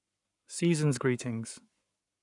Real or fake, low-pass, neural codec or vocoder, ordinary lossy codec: fake; 10.8 kHz; codec, 44.1 kHz, 7.8 kbps, Pupu-Codec; none